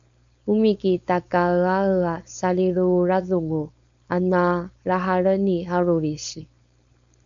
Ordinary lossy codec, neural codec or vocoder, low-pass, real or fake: MP3, 64 kbps; codec, 16 kHz, 4.8 kbps, FACodec; 7.2 kHz; fake